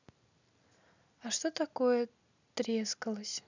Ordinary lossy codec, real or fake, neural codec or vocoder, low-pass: none; real; none; 7.2 kHz